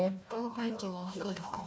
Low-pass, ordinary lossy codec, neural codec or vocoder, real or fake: none; none; codec, 16 kHz, 1 kbps, FunCodec, trained on Chinese and English, 50 frames a second; fake